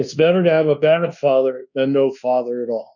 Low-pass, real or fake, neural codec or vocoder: 7.2 kHz; fake; codec, 24 kHz, 1.2 kbps, DualCodec